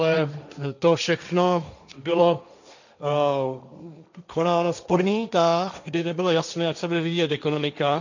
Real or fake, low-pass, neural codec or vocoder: fake; 7.2 kHz; codec, 16 kHz, 1.1 kbps, Voila-Tokenizer